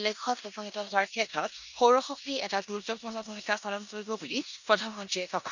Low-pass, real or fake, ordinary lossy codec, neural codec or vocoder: 7.2 kHz; fake; none; codec, 16 kHz in and 24 kHz out, 0.9 kbps, LongCat-Audio-Codec, four codebook decoder